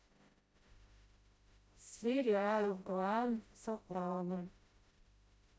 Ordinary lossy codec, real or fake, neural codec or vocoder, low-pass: none; fake; codec, 16 kHz, 0.5 kbps, FreqCodec, smaller model; none